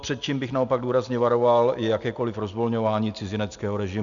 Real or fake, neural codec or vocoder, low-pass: real; none; 7.2 kHz